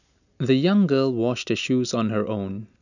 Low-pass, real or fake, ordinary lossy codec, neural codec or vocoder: 7.2 kHz; real; none; none